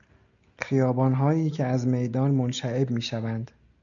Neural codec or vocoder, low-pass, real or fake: none; 7.2 kHz; real